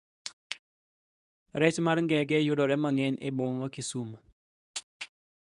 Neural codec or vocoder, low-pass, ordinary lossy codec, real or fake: codec, 24 kHz, 0.9 kbps, WavTokenizer, medium speech release version 2; 10.8 kHz; none; fake